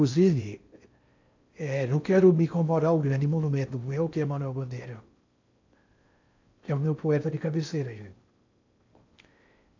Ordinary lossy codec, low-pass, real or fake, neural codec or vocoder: AAC, 48 kbps; 7.2 kHz; fake; codec, 16 kHz in and 24 kHz out, 0.6 kbps, FocalCodec, streaming, 4096 codes